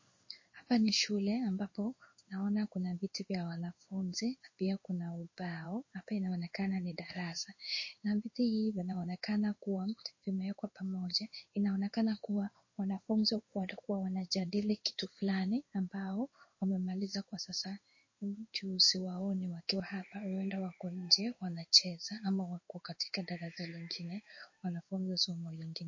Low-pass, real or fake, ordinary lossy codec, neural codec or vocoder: 7.2 kHz; fake; MP3, 32 kbps; codec, 16 kHz in and 24 kHz out, 1 kbps, XY-Tokenizer